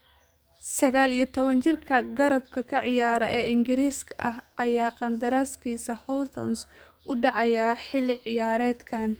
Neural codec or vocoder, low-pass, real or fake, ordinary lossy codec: codec, 44.1 kHz, 2.6 kbps, SNAC; none; fake; none